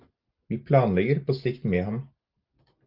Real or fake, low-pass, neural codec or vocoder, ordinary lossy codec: real; 5.4 kHz; none; Opus, 32 kbps